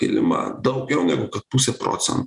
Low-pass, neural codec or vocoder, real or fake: 10.8 kHz; none; real